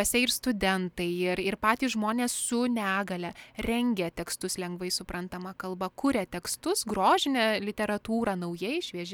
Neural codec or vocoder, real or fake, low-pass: none; real; 19.8 kHz